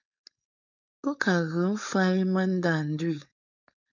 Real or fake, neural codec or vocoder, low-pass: fake; codec, 16 kHz, 4.8 kbps, FACodec; 7.2 kHz